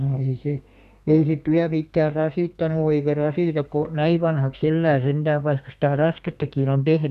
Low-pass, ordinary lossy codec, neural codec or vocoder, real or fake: 14.4 kHz; none; codec, 32 kHz, 1.9 kbps, SNAC; fake